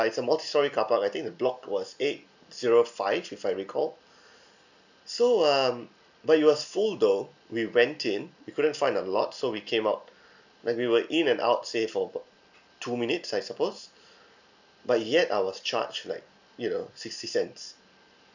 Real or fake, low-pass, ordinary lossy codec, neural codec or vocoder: real; 7.2 kHz; none; none